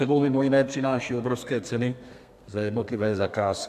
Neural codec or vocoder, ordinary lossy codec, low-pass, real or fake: codec, 32 kHz, 1.9 kbps, SNAC; AAC, 96 kbps; 14.4 kHz; fake